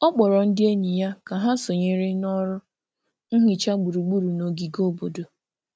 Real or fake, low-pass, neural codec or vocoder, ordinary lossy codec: real; none; none; none